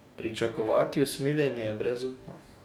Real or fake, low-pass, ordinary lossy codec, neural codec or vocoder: fake; 19.8 kHz; none; codec, 44.1 kHz, 2.6 kbps, DAC